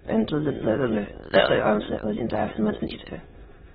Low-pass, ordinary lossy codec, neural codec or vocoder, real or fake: 9.9 kHz; AAC, 16 kbps; autoencoder, 22.05 kHz, a latent of 192 numbers a frame, VITS, trained on many speakers; fake